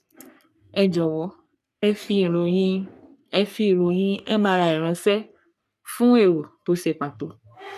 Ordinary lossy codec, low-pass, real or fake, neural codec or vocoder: none; 14.4 kHz; fake; codec, 44.1 kHz, 3.4 kbps, Pupu-Codec